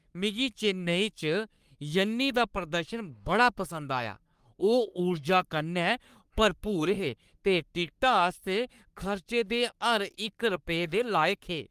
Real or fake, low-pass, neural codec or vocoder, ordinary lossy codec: fake; 14.4 kHz; codec, 44.1 kHz, 3.4 kbps, Pupu-Codec; Opus, 32 kbps